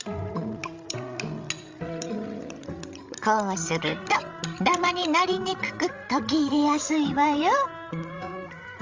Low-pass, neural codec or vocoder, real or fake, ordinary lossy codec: 7.2 kHz; codec, 16 kHz, 16 kbps, FreqCodec, larger model; fake; Opus, 32 kbps